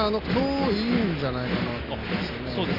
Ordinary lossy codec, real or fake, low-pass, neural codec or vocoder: AAC, 24 kbps; real; 5.4 kHz; none